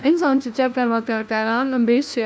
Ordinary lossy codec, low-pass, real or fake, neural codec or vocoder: none; none; fake; codec, 16 kHz, 1 kbps, FunCodec, trained on LibriTTS, 50 frames a second